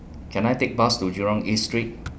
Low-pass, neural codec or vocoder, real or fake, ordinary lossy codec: none; none; real; none